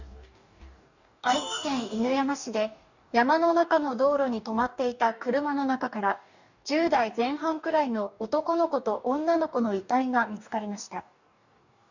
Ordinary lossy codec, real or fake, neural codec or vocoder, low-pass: none; fake; codec, 44.1 kHz, 2.6 kbps, DAC; 7.2 kHz